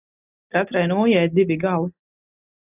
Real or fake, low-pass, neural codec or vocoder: real; 3.6 kHz; none